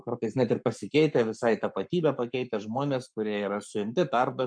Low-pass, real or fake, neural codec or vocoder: 9.9 kHz; fake; codec, 44.1 kHz, 7.8 kbps, Pupu-Codec